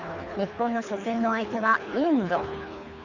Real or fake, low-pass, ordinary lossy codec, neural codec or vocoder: fake; 7.2 kHz; none; codec, 24 kHz, 3 kbps, HILCodec